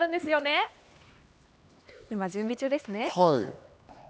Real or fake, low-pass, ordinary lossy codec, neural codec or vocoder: fake; none; none; codec, 16 kHz, 2 kbps, X-Codec, HuBERT features, trained on LibriSpeech